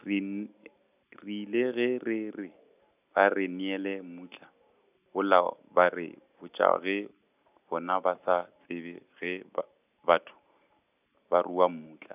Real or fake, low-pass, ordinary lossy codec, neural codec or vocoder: real; 3.6 kHz; none; none